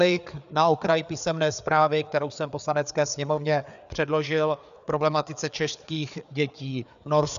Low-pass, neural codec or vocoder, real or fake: 7.2 kHz; codec, 16 kHz, 4 kbps, FreqCodec, larger model; fake